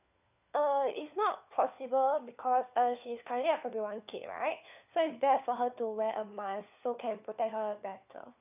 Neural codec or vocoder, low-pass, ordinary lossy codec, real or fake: codec, 16 kHz, 4 kbps, FunCodec, trained on LibriTTS, 50 frames a second; 3.6 kHz; none; fake